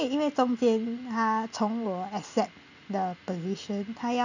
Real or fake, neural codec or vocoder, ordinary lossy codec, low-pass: real; none; none; 7.2 kHz